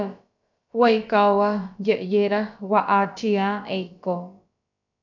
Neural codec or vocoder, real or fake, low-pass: codec, 16 kHz, about 1 kbps, DyCAST, with the encoder's durations; fake; 7.2 kHz